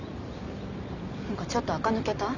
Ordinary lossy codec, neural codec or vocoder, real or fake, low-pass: none; none; real; 7.2 kHz